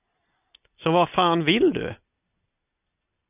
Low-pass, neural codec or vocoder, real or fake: 3.6 kHz; none; real